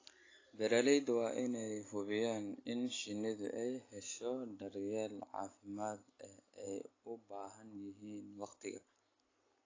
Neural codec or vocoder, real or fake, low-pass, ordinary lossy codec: none; real; 7.2 kHz; AAC, 32 kbps